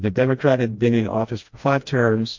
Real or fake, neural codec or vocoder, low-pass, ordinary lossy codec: fake; codec, 16 kHz, 1 kbps, FreqCodec, smaller model; 7.2 kHz; MP3, 48 kbps